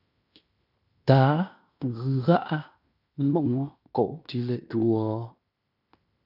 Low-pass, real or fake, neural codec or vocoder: 5.4 kHz; fake; codec, 16 kHz in and 24 kHz out, 0.9 kbps, LongCat-Audio-Codec, fine tuned four codebook decoder